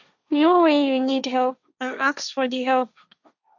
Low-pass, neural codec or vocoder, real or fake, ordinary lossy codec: 7.2 kHz; codec, 16 kHz, 1.1 kbps, Voila-Tokenizer; fake; none